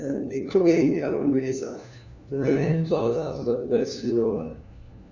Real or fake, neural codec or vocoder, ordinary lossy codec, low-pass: fake; codec, 16 kHz, 1 kbps, FunCodec, trained on LibriTTS, 50 frames a second; none; 7.2 kHz